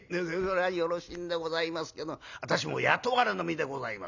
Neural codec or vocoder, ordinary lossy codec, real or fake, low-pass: none; none; real; 7.2 kHz